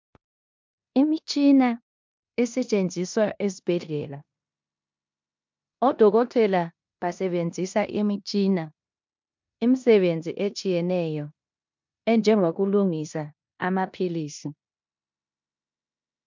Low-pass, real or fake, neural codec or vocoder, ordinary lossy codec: 7.2 kHz; fake; codec, 16 kHz in and 24 kHz out, 0.9 kbps, LongCat-Audio-Codec, four codebook decoder; MP3, 64 kbps